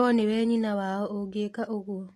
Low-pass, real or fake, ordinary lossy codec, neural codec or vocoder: 14.4 kHz; real; AAC, 48 kbps; none